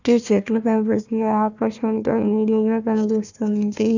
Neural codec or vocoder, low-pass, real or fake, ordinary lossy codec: codec, 16 kHz, 1 kbps, FunCodec, trained on Chinese and English, 50 frames a second; 7.2 kHz; fake; none